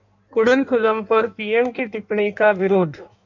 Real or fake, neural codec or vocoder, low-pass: fake; codec, 16 kHz in and 24 kHz out, 1.1 kbps, FireRedTTS-2 codec; 7.2 kHz